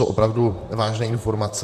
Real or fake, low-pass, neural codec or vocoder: fake; 14.4 kHz; vocoder, 44.1 kHz, 128 mel bands, Pupu-Vocoder